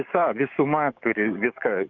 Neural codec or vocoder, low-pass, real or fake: codec, 44.1 kHz, 7.8 kbps, DAC; 7.2 kHz; fake